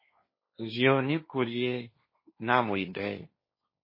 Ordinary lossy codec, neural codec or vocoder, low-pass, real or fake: MP3, 24 kbps; codec, 16 kHz, 1.1 kbps, Voila-Tokenizer; 5.4 kHz; fake